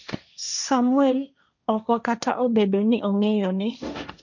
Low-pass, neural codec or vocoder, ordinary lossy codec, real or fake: 7.2 kHz; codec, 16 kHz, 1.1 kbps, Voila-Tokenizer; none; fake